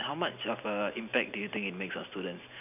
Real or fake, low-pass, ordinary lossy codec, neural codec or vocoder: real; 3.6 kHz; none; none